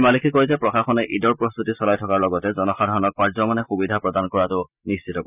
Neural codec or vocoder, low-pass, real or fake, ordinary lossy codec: none; 3.6 kHz; real; none